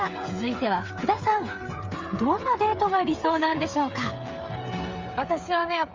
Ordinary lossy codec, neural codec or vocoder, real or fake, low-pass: Opus, 32 kbps; codec, 16 kHz, 8 kbps, FreqCodec, smaller model; fake; 7.2 kHz